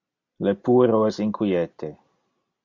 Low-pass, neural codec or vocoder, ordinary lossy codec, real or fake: 7.2 kHz; none; MP3, 48 kbps; real